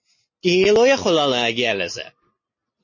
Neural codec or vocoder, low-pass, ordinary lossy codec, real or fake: none; 7.2 kHz; MP3, 32 kbps; real